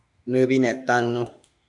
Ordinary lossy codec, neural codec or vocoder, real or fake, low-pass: MP3, 96 kbps; autoencoder, 48 kHz, 32 numbers a frame, DAC-VAE, trained on Japanese speech; fake; 10.8 kHz